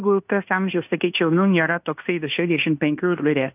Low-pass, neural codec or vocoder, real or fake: 3.6 kHz; codec, 16 kHz in and 24 kHz out, 0.9 kbps, LongCat-Audio-Codec, fine tuned four codebook decoder; fake